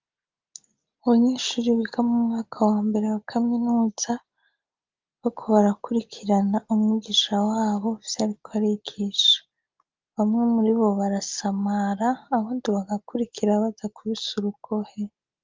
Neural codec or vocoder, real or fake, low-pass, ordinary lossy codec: none; real; 7.2 kHz; Opus, 24 kbps